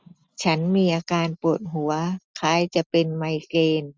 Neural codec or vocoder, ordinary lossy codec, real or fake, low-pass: none; none; real; none